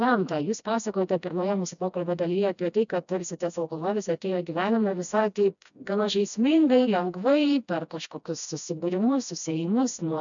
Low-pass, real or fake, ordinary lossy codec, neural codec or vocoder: 7.2 kHz; fake; MP3, 96 kbps; codec, 16 kHz, 1 kbps, FreqCodec, smaller model